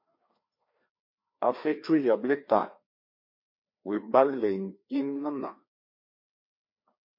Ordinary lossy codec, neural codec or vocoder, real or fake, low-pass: MP3, 32 kbps; codec, 16 kHz, 2 kbps, FreqCodec, larger model; fake; 5.4 kHz